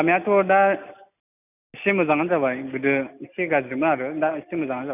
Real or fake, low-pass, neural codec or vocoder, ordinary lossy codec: real; 3.6 kHz; none; none